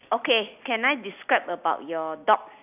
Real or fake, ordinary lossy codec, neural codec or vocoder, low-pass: real; none; none; 3.6 kHz